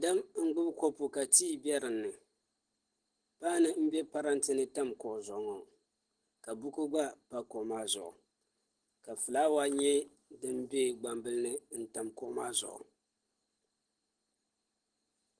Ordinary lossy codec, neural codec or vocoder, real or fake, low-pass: Opus, 16 kbps; none; real; 9.9 kHz